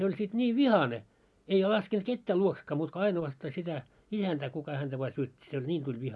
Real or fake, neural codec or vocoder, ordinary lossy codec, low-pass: real; none; none; none